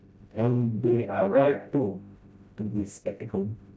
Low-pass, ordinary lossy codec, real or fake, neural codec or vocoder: none; none; fake; codec, 16 kHz, 0.5 kbps, FreqCodec, smaller model